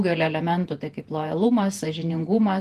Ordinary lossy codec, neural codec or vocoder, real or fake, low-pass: Opus, 24 kbps; vocoder, 48 kHz, 128 mel bands, Vocos; fake; 14.4 kHz